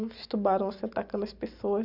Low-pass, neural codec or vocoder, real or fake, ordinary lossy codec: 5.4 kHz; none; real; Opus, 64 kbps